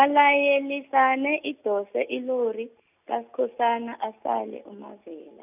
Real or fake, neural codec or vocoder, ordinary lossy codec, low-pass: real; none; none; 3.6 kHz